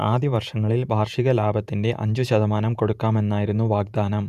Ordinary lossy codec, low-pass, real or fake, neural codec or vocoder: none; 14.4 kHz; fake; vocoder, 48 kHz, 128 mel bands, Vocos